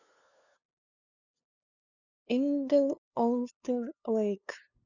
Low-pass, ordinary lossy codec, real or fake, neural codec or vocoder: 7.2 kHz; none; fake; codec, 16 kHz, 2 kbps, FunCodec, trained on LibriTTS, 25 frames a second